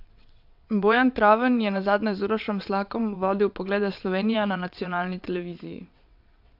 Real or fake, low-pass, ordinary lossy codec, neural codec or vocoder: fake; 5.4 kHz; AAC, 48 kbps; vocoder, 22.05 kHz, 80 mel bands, WaveNeXt